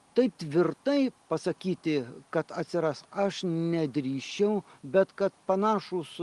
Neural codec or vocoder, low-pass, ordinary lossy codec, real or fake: none; 10.8 kHz; Opus, 24 kbps; real